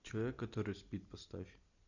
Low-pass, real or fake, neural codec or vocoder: 7.2 kHz; real; none